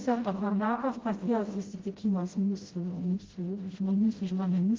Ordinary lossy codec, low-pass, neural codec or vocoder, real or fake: Opus, 16 kbps; 7.2 kHz; codec, 16 kHz, 0.5 kbps, FreqCodec, smaller model; fake